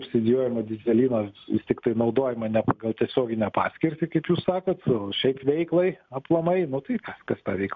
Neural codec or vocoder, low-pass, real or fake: none; 7.2 kHz; real